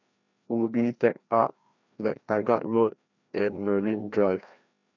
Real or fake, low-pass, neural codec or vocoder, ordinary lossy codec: fake; 7.2 kHz; codec, 16 kHz, 1 kbps, FreqCodec, larger model; none